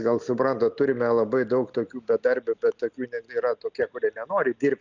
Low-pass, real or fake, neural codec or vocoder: 7.2 kHz; real; none